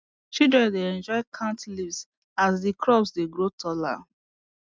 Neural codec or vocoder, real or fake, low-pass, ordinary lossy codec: none; real; none; none